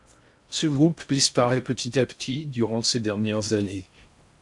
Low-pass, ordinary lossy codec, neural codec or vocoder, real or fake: 10.8 kHz; MP3, 96 kbps; codec, 16 kHz in and 24 kHz out, 0.6 kbps, FocalCodec, streaming, 2048 codes; fake